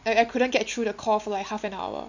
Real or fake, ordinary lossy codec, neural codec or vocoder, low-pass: real; none; none; 7.2 kHz